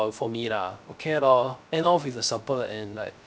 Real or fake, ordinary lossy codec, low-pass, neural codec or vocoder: fake; none; none; codec, 16 kHz, 0.3 kbps, FocalCodec